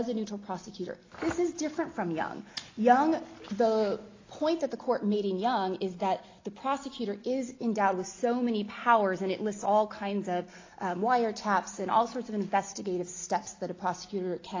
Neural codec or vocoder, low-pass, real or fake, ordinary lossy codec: none; 7.2 kHz; real; AAC, 32 kbps